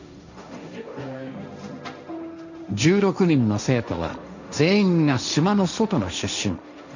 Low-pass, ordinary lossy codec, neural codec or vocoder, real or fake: 7.2 kHz; none; codec, 16 kHz, 1.1 kbps, Voila-Tokenizer; fake